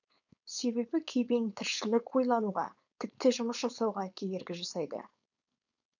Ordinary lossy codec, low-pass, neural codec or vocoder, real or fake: none; 7.2 kHz; codec, 16 kHz, 4.8 kbps, FACodec; fake